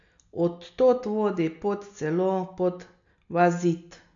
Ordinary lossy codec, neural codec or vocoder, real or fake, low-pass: AAC, 64 kbps; none; real; 7.2 kHz